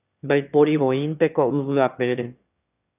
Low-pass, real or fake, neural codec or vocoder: 3.6 kHz; fake; autoencoder, 22.05 kHz, a latent of 192 numbers a frame, VITS, trained on one speaker